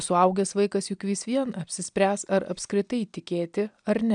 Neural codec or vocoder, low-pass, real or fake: none; 9.9 kHz; real